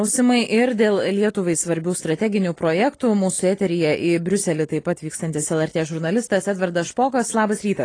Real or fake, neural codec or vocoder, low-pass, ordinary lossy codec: real; none; 9.9 kHz; AAC, 32 kbps